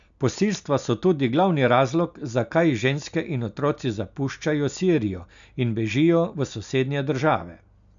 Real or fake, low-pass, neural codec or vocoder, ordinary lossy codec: real; 7.2 kHz; none; none